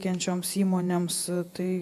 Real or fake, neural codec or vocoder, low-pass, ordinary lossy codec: fake; vocoder, 48 kHz, 128 mel bands, Vocos; 14.4 kHz; AAC, 96 kbps